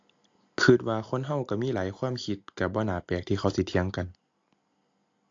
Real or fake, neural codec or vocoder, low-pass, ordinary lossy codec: real; none; 7.2 kHz; AAC, 48 kbps